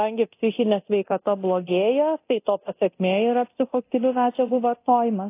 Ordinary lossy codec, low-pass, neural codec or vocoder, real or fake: AAC, 24 kbps; 3.6 kHz; codec, 24 kHz, 0.9 kbps, DualCodec; fake